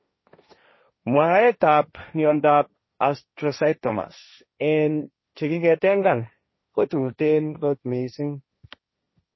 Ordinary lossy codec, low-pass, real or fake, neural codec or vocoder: MP3, 24 kbps; 7.2 kHz; fake; codec, 16 kHz, 1.1 kbps, Voila-Tokenizer